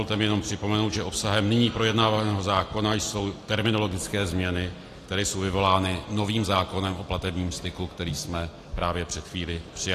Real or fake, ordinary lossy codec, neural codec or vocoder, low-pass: real; AAC, 48 kbps; none; 14.4 kHz